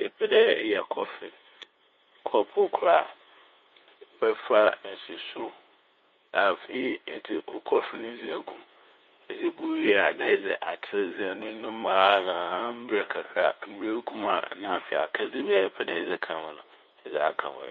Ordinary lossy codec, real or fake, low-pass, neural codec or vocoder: MP3, 48 kbps; fake; 7.2 kHz; codec, 16 kHz, 2 kbps, FunCodec, trained on LibriTTS, 25 frames a second